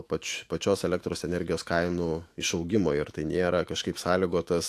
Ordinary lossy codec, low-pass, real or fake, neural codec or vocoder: AAC, 64 kbps; 14.4 kHz; fake; autoencoder, 48 kHz, 128 numbers a frame, DAC-VAE, trained on Japanese speech